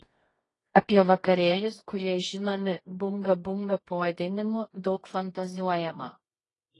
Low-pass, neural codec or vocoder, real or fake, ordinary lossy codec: 10.8 kHz; codec, 24 kHz, 0.9 kbps, WavTokenizer, medium music audio release; fake; AAC, 32 kbps